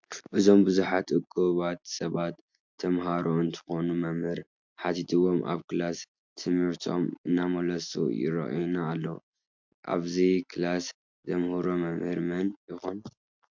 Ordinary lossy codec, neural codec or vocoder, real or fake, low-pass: MP3, 64 kbps; none; real; 7.2 kHz